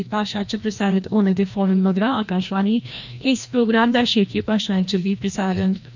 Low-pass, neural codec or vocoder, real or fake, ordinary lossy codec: 7.2 kHz; codec, 16 kHz, 1 kbps, FreqCodec, larger model; fake; none